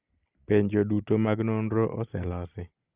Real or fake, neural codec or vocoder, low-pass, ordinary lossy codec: real; none; 3.6 kHz; Opus, 24 kbps